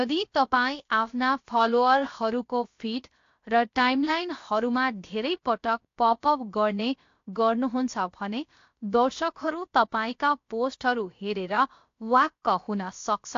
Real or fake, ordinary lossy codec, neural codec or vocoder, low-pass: fake; AAC, 48 kbps; codec, 16 kHz, about 1 kbps, DyCAST, with the encoder's durations; 7.2 kHz